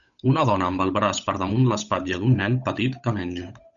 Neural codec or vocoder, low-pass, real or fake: codec, 16 kHz, 8 kbps, FunCodec, trained on Chinese and English, 25 frames a second; 7.2 kHz; fake